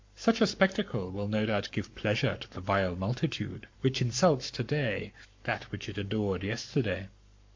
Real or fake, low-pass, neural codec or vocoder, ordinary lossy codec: fake; 7.2 kHz; codec, 44.1 kHz, 7.8 kbps, Pupu-Codec; MP3, 48 kbps